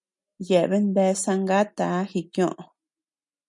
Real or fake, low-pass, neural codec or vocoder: real; 10.8 kHz; none